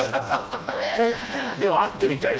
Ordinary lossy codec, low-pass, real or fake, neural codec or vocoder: none; none; fake; codec, 16 kHz, 1 kbps, FreqCodec, smaller model